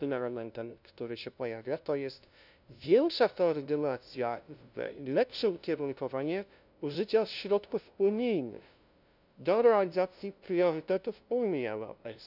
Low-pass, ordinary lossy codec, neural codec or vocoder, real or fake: 5.4 kHz; none; codec, 16 kHz, 0.5 kbps, FunCodec, trained on LibriTTS, 25 frames a second; fake